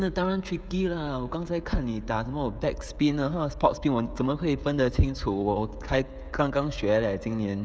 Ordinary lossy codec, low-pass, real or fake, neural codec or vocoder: none; none; fake; codec, 16 kHz, 16 kbps, FreqCodec, smaller model